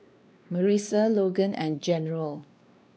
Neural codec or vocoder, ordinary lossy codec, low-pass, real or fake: codec, 16 kHz, 2 kbps, X-Codec, WavLM features, trained on Multilingual LibriSpeech; none; none; fake